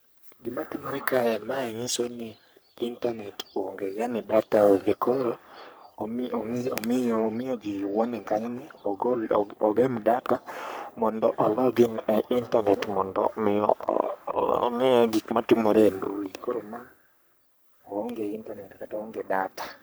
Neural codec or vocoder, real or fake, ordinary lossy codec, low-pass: codec, 44.1 kHz, 3.4 kbps, Pupu-Codec; fake; none; none